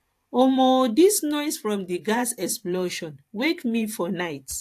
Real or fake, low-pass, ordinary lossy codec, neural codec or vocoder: fake; 14.4 kHz; AAC, 64 kbps; vocoder, 44.1 kHz, 128 mel bands every 512 samples, BigVGAN v2